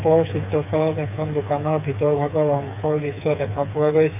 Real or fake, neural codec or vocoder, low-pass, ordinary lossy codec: fake; codec, 16 kHz, 4 kbps, FreqCodec, smaller model; 3.6 kHz; none